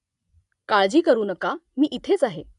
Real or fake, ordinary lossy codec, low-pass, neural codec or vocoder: fake; none; 10.8 kHz; vocoder, 24 kHz, 100 mel bands, Vocos